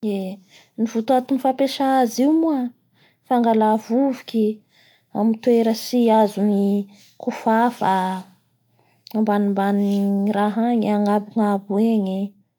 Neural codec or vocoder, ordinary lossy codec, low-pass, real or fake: none; none; 19.8 kHz; real